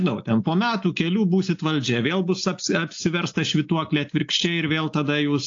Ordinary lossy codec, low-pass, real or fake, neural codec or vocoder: AAC, 48 kbps; 7.2 kHz; real; none